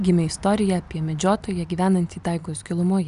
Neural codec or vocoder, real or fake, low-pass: none; real; 10.8 kHz